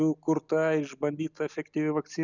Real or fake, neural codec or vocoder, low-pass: real; none; 7.2 kHz